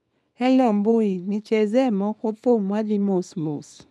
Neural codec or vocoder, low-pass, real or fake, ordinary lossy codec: codec, 24 kHz, 0.9 kbps, WavTokenizer, small release; none; fake; none